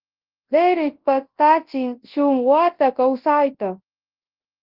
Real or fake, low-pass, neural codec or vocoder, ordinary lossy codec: fake; 5.4 kHz; codec, 24 kHz, 0.9 kbps, WavTokenizer, large speech release; Opus, 16 kbps